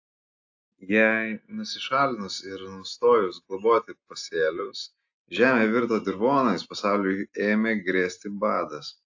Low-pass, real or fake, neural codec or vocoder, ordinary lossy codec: 7.2 kHz; real; none; AAC, 48 kbps